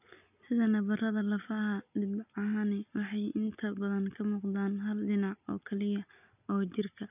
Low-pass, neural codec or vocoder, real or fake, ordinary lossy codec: 3.6 kHz; none; real; none